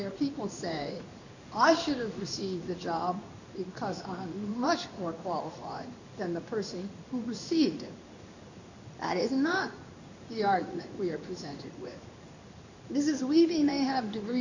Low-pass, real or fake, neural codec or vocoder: 7.2 kHz; fake; codec, 16 kHz in and 24 kHz out, 1 kbps, XY-Tokenizer